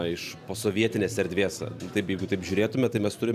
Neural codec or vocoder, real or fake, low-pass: none; real; 14.4 kHz